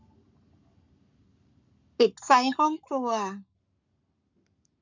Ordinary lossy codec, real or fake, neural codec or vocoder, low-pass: none; fake; codec, 44.1 kHz, 7.8 kbps, Pupu-Codec; 7.2 kHz